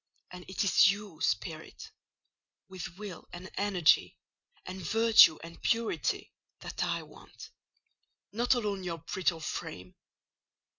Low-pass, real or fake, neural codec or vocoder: 7.2 kHz; real; none